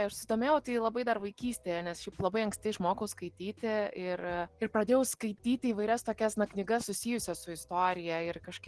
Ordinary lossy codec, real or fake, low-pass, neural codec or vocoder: Opus, 16 kbps; real; 10.8 kHz; none